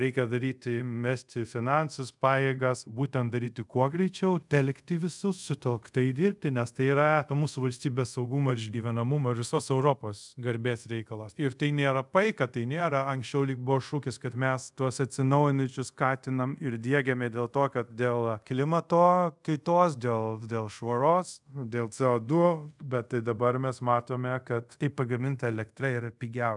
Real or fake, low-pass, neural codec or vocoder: fake; 10.8 kHz; codec, 24 kHz, 0.5 kbps, DualCodec